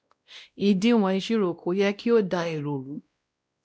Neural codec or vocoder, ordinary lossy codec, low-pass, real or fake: codec, 16 kHz, 1 kbps, X-Codec, WavLM features, trained on Multilingual LibriSpeech; none; none; fake